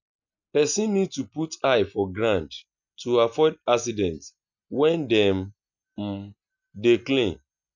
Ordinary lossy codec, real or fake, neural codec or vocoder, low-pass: none; real; none; 7.2 kHz